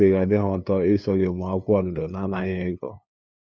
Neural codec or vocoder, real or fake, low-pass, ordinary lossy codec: codec, 16 kHz, 2 kbps, FunCodec, trained on LibriTTS, 25 frames a second; fake; none; none